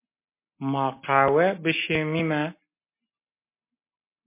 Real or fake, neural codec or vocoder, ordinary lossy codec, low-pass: real; none; MP3, 24 kbps; 3.6 kHz